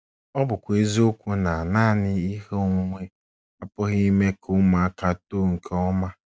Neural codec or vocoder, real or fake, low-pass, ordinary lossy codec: none; real; none; none